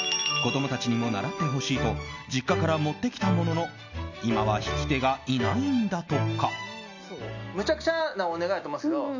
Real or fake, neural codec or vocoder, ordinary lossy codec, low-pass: real; none; none; 7.2 kHz